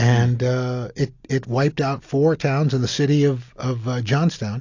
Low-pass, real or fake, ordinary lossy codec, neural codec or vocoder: 7.2 kHz; real; AAC, 48 kbps; none